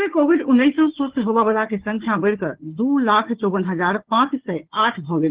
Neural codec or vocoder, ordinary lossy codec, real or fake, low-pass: codec, 16 kHz, 4 kbps, FunCodec, trained on Chinese and English, 50 frames a second; Opus, 16 kbps; fake; 3.6 kHz